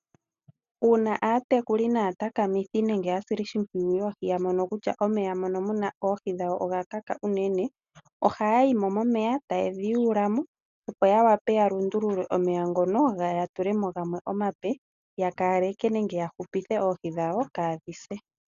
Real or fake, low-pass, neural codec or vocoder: real; 7.2 kHz; none